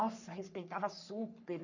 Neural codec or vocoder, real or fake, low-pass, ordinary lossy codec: codec, 44.1 kHz, 3.4 kbps, Pupu-Codec; fake; 7.2 kHz; none